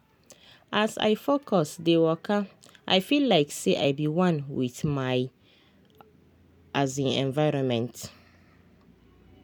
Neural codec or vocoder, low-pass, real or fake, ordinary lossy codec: none; none; real; none